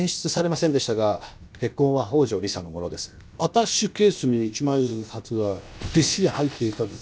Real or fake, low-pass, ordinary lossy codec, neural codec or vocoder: fake; none; none; codec, 16 kHz, about 1 kbps, DyCAST, with the encoder's durations